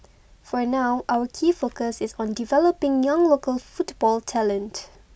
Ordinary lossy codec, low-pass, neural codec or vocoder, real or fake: none; none; none; real